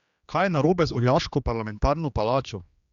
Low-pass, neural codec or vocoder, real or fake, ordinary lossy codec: 7.2 kHz; codec, 16 kHz, 2 kbps, X-Codec, HuBERT features, trained on general audio; fake; Opus, 64 kbps